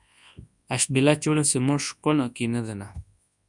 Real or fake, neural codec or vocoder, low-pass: fake; codec, 24 kHz, 0.9 kbps, WavTokenizer, large speech release; 10.8 kHz